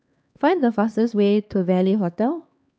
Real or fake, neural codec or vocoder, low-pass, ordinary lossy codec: fake; codec, 16 kHz, 2 kbps, X-Codec, HuBERT features, trained on LibriSpeech; none; none